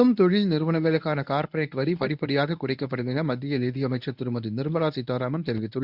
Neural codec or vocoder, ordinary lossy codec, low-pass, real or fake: codec, 24 kHz, 0.9 kbps, WavTokenizer, medium speech release version 1; none; 5.4 kHz; fake